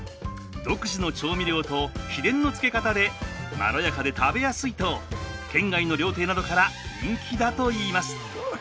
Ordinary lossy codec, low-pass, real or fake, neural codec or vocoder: none; none; real; none